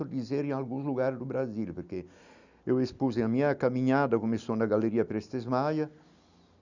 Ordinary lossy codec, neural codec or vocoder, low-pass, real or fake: none; none; 7.2 kHz; real